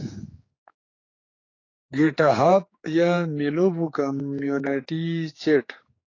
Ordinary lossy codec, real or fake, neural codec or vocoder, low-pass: AAC, 32 kbps; fake; codec, 16 kHz, 4 kbps, X-Codec, HuBERT features, trained on general audio; 7.2 kHz